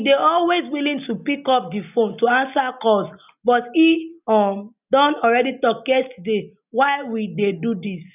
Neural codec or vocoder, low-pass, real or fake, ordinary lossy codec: none; 3.6 kHz; real; none